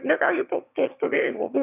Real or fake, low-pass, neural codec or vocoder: fake; 3.6 kHz; autoencoder, 22.05 kHz, a latent of 192 numbers a frame, VITS, trained on one speaker